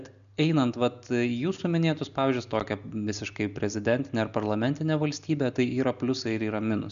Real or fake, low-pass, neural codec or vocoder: real; 7.2 kHz; none